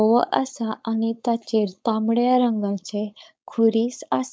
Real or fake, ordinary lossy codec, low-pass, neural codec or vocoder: fake; none; none; codec, 16 kHz, 4.8 kbps, FACodec